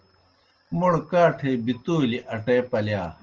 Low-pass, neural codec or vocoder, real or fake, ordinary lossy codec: 7.2 kHz; none; real; Opus, 16 kbps